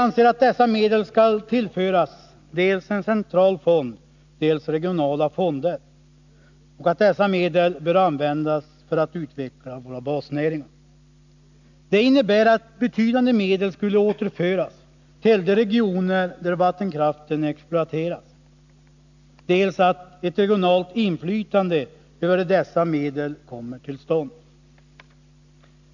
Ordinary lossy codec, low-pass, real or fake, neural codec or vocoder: none; 7.2 kHz; real; none